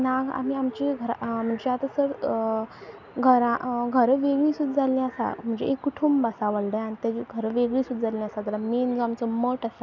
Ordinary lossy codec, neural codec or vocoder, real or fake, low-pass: none; none; real; 7.2 kHz